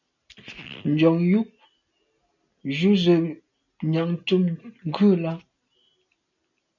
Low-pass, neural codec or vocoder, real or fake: 7.2 kHz; none; real